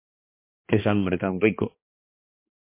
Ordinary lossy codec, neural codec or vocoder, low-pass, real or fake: MP3, 24 kbps; codec, 16 kHz, 2 kbps, X-Codec, HuBERT features, trained on balanced general audio; 3.6 kHz; fake